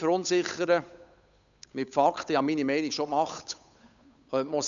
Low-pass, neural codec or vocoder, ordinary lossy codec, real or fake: 7.2 kHz; codec, 16 kHz, 8 kbps, FunCodec, trained on Chinese and English, 25 frames a second; none; fake